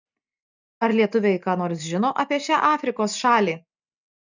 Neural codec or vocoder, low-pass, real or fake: none; 7.2 kHz; real